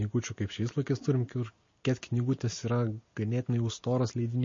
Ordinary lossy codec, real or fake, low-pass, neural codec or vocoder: MP3, 32 kbps; real; 7.2 kHz; none